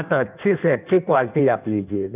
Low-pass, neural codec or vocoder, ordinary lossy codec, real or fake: 3.6 kHz; codec, 16 kHz in and 24 kHz out, 1.1 kbps, FireRedTTS-2 codec; none; fake